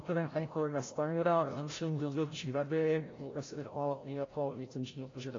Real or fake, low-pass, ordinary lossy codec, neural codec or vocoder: fake; 7.2 kHz; AAC, 32 kbps; codec, 16 kHz, 0.5 kbps, FreqCodec, larger model